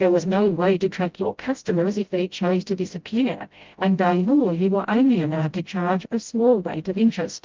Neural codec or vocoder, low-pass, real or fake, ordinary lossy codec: codec, 16 kHz, 0.5 kbps, FreqCodec, smaller model; 7.2 kHz; fake; Opus, 32 kbps